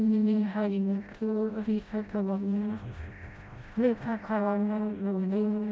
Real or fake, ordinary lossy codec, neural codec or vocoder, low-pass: fake; none; codec, 16 kHz, 0.5 kbps, FreqCodec, smaller model; none